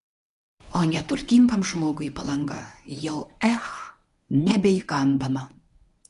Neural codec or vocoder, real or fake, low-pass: codec, 24 kHz, 0.9 kbps, WavTokenizer, medium speech release version 1; fake; 10.8 kHz